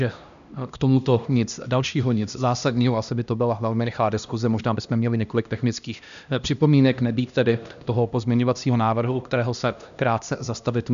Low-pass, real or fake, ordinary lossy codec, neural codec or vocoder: 7.2 kHz; fake; MP3, 96 kbps; codec, 16 kHz, 1 kbps, X-Codec, HuBERT features, trained on LibriSpeech